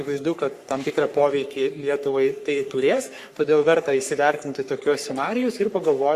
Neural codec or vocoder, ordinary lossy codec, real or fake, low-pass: codec, 44.1 kHz, 3.4 kbps, Pupu-Codec; Opus, 64 kbps; fake; 14.4 kHz